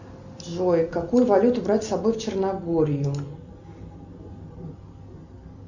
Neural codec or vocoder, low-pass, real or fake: none; 7.2 kHz; real